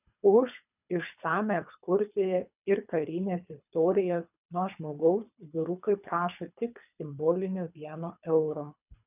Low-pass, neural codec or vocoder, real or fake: 3.6 kHz; codec, 24 kHz, 3 kbps, HILCodec; fake